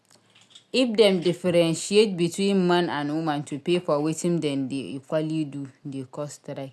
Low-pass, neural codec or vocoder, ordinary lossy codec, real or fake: none; none; none; real